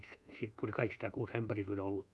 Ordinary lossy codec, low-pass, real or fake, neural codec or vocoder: none; none; fake; codec, 24 kHz, 1.2 kbps, DualCodec